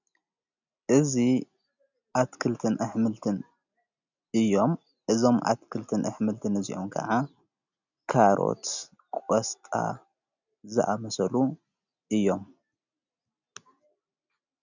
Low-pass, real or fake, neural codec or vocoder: 7.2 kHz; real; none